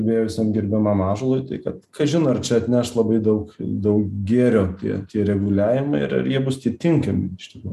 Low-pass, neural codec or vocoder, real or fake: 14.4 kHz; none; real